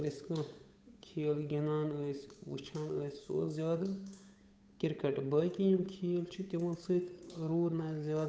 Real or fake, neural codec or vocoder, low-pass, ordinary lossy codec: fake; codec, 16 kHz, 8 kbps, FunCodec, trained on Chinese and English, 25 frames a second; none; none